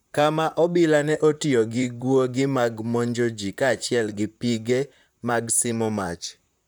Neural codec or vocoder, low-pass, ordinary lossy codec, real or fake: vocoder, 44.1 kHz, 128 mel bands, Pupu-Vocoder; none; none; fake